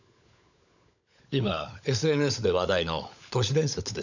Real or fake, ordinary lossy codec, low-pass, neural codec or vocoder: fake; none; 7.2 kHz; codec, 16 kHz, 16 kbps, FunCodec, trained on LibriTTS, 50 frames a second